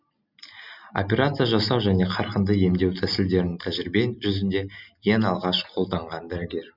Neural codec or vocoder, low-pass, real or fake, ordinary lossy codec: none; 5.4 kHz; real; none